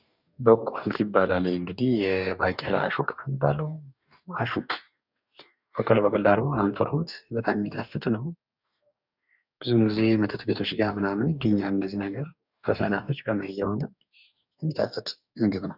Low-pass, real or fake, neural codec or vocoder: 5.4 kHz; fake; codec, 44.1 kHz, 2.6 kbps, DAC